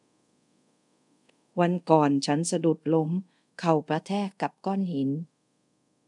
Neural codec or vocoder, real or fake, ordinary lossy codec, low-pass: codec, 24 kHz, 0.5 kbps, DualCodec; fake; none; 10.8 kHz